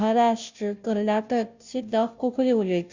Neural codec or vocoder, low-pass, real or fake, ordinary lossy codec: codec, 16 kHz, 0.5 kbps, FunCodec, trained on Chinese and English, 25 frames a second; 7.2 kHz; fake; Opus, 64 kbps